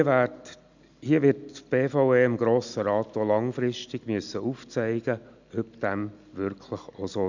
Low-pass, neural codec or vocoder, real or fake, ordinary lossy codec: 7.2 kHz; none; real; none